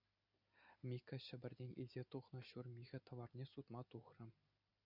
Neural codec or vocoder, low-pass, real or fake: none; 5.4 kHz; real